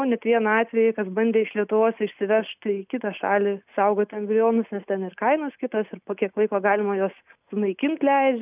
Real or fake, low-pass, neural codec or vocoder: real; 3.6 kHz; none